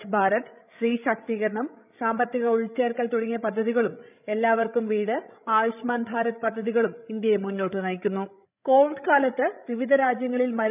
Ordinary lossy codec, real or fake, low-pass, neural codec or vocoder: none; fake; 3.6 kHz; codec, 16 kHz, 16 kbps, FreqCodec, larger model